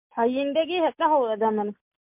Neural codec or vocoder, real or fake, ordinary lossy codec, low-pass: none; real; MP3, 32 kbps; 3.6 kHz